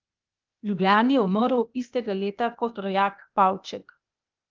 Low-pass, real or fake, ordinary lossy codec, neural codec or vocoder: 7.2 kHz; fake; Opus, 24 kbps; codec, 16 kHz, 0.8 kbps, ZipCodec